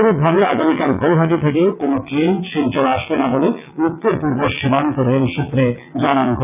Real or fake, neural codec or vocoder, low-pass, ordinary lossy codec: fake; vocoder, 22.05 kHz, 80 mel bands, WaveNeXt; 3.6 kHz; none